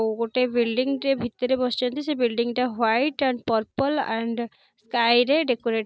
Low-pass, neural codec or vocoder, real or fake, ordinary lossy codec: none; none; real; none